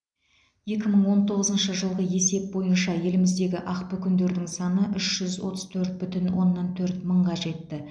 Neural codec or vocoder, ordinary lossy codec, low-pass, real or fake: none; none; 9.9 kHz; real